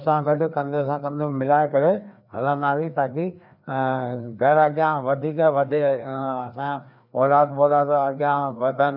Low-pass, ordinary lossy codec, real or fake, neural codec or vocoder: 5.4 kHz; none; fake; codec, 16 kHz, 2 kbps, FreqCodec, larger model